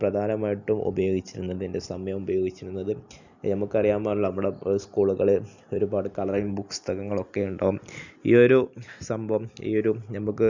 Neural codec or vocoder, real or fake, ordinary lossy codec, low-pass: none; real; none; 7.2 kHz